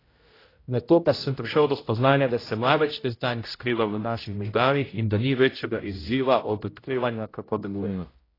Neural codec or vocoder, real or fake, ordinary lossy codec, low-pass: codec, 16 kHz, 0.5 kbps, X-Codec, HuBERT features, trained on general audio; fake; AAC, 24 kbps; 5.4 kHz